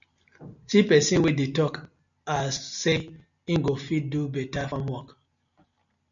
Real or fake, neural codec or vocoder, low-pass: real; none; 7.2 kHz